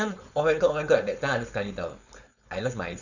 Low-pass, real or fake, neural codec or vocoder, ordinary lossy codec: 7.2 kHz; fake; codec, 16 kHz, 4.8 kbps, FACodec; none